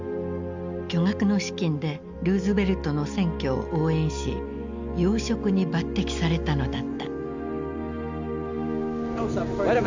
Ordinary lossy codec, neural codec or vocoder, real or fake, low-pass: MP3, 64 kbps; none; real; 7.2 kHz